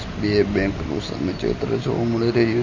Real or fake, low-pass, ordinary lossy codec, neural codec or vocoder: real; 7.2 kHz; MP3, 32 kbps; none